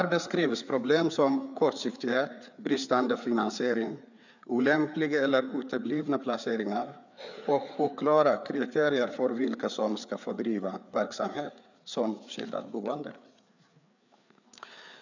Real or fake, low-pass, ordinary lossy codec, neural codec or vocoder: fake; 7.2 kHz; none; codec, 16 kHz, 4 kbps, FreqCodec, larger model